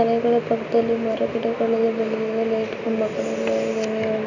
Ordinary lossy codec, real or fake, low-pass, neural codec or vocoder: none; real; 7.2 kHz; none